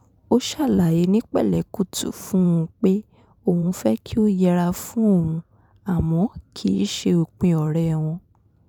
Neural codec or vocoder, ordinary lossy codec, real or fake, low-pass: vocoder, 44.1 kHz, 128 mel bands every 512 samples, BigVGAN v2; none; fake; 19.8 kHz